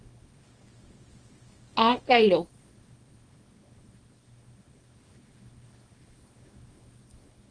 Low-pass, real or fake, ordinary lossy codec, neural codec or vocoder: 9.9 kHz; fake; Opus, 24 kbps; codec, 24 kHz, 0.9 kbps, WavTokenizer, small release